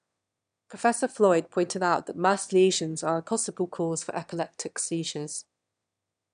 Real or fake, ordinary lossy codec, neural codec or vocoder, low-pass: fake; none; autoencoder, 22.05 kHz, a latent of 192 numbers a frame, VITS, trained on one speaker; 9.9 kHz